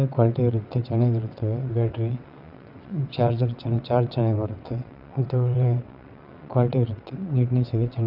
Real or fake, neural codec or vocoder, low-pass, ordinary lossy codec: fake; vocoder, 22.05 kHz, 80 mel bands, WaveNeXt; 5.4 kHz; none